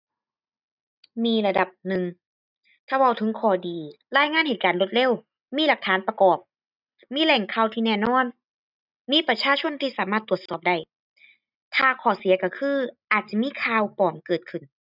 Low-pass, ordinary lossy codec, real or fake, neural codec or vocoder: 5.4 kHz; none; real; none